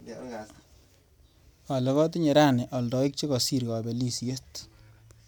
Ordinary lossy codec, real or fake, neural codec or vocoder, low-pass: none; real; none; none